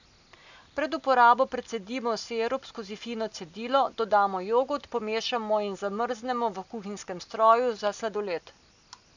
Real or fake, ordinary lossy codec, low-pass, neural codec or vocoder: real; none; 7.2 kHz; none